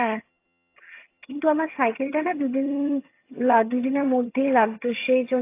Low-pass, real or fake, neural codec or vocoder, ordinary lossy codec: 3.6 kHz; fake; vocoder, 22.05 kHz, 80 mel bands, HiFi-GAN; AAC, 24 kbps